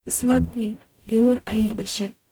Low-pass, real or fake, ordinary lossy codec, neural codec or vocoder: none; fake; none; codec, 44.1 kHz, 0.9 kbps, DAC